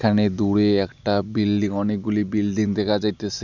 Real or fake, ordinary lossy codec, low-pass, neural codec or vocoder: real; none; 7.2 kHz; none